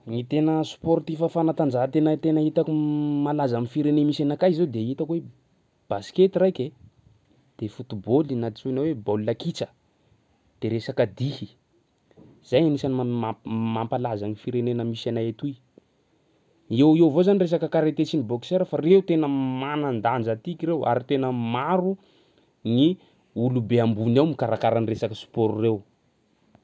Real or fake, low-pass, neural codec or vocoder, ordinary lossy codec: real; none; none; none